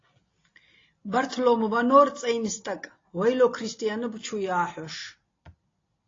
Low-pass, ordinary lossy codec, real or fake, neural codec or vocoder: 7.2 kHz; AAC, 32 kbps; real; none